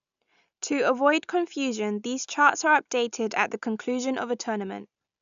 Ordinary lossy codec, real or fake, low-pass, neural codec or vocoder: none; real; 7.2 kHz; none